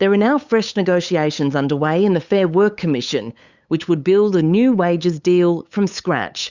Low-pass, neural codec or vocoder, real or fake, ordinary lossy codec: 7.2 kHz; codec, 16 kHz, 8 kbps, FunCodec, trained on LibriTTS, 25 frames a second; fake; Opus, 64 kbps